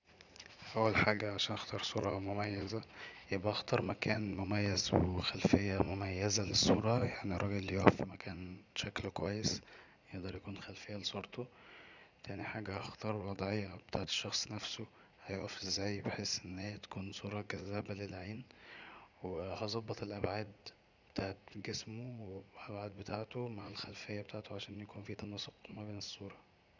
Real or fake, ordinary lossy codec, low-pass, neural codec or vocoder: fake; none; 7.2 kHz; vocoder, 44.1 kHz, 80 mel bands, Vocos